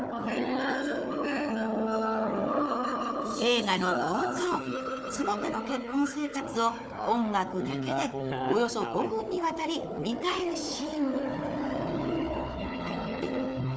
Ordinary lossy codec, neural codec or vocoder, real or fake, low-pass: none; codec, 16 kHz, 4 kbps, FunCodec, trained on Chinese and English, 50 frames a second; fake; none